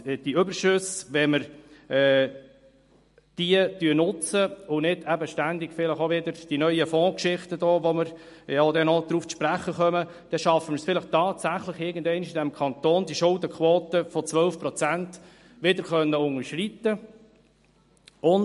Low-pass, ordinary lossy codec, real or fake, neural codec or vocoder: 14.4 kHz; MP3, 48 kbps; real; none